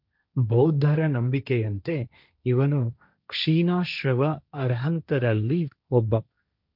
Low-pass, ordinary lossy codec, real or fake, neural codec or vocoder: 5.4 kHz; none; fake; codec, 16 kHz, 1.1 kbps, Voila-Tokenizer